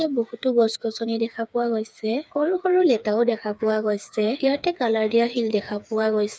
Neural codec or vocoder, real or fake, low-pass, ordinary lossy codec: codec, 16 kHz, 4 kbps, FreqCodec, smaller model; fake; none; none